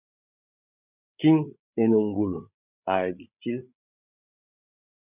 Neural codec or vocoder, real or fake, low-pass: codec, 16 kHz, 8 kbps, FreqCodec, larger model; fake; 3.6 kHz